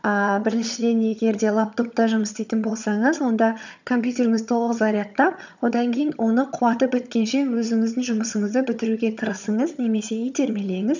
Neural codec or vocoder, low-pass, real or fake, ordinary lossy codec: vocoder, 22.05 kHz, 80 mel bands, HiFi-GAN; 7.2 kHz; fake; none